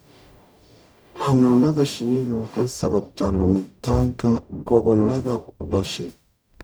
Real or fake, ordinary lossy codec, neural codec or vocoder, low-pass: fake; none; codec, 44.1 kHz, 0.9 kbps, DAC; none